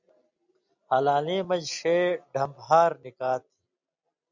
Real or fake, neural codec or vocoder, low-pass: real; none; 7.2 kHz